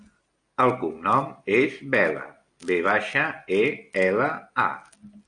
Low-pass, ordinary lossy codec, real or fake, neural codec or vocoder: 9.9 kHz; AAC, 48 kbps; real; none